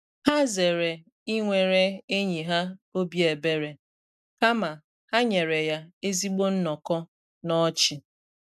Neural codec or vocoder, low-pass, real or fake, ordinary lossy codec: none; 14.4 kHz; real; none